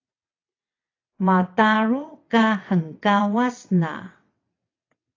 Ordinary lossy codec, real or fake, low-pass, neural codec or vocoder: AAC, 32 kbps; fake; 7.2 kHz; vocoder, 22.05 kHz, 80 mel bands, WaveNeXt